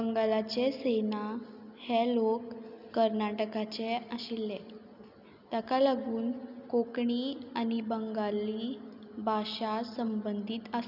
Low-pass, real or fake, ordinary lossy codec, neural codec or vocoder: 5.4 kHz; real; none; none